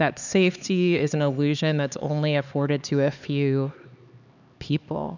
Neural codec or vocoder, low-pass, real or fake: codec, 16 kHz, 4 kbps, X-Codec, HuBERT features, trained on LibriSpeech; 7.2 kHz; fake